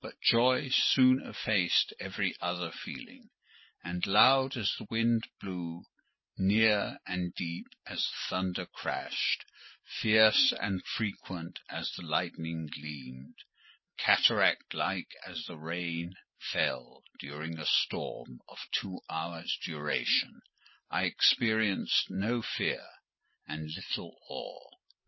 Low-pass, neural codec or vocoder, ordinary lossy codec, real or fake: 7.2 kHz; none; MP3, 24 kbps; real